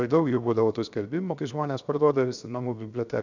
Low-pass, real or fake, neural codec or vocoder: 7.2 kHz; fake; codec, 16 kHz, 0.7 kbps, FocalCodec